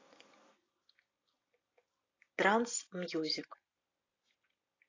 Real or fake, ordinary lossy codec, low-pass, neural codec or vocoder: real; AAC, 32 kbps; 7.2 kHz; none